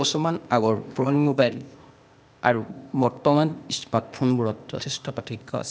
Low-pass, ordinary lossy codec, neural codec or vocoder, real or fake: none; none; codec, 16 kHz, 0.8 kbps, ZipCodec; fake